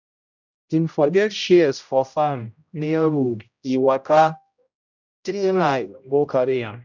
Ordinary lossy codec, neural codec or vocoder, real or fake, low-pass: none; codec, 16 kHz, 0.5 kbps, X-Codec, HuBERT features, trained on general audio; fake; 7.2 kHz